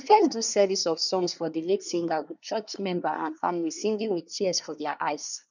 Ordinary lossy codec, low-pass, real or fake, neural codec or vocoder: none; 7.2 kHz; fake; codec, 24 kHz, 1 kbps, SNAC